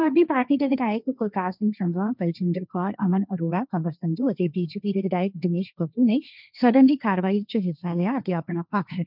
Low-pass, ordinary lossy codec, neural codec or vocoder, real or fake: 5.4 kHz; none; codec, 16 kHz, 1.1 kbps, Voila-Tokenizer; fake